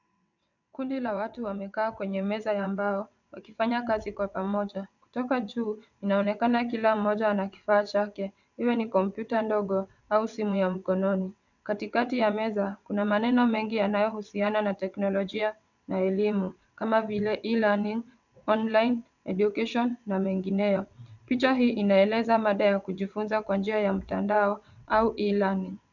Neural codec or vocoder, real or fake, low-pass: vocoder, 22.05 kHz, 80 mel bands, WaveNeXt; fake; 7.2 kHz